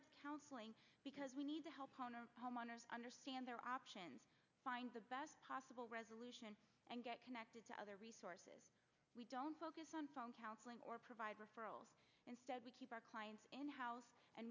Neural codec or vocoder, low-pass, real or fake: none; 7.2 kHz; real